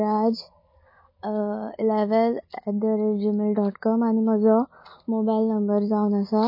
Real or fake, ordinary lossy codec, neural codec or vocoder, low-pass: real; MP3, 24 kbps; none; 5.4 kHz